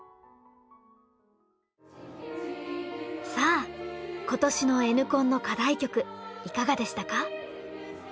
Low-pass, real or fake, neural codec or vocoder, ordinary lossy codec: none; real; none; none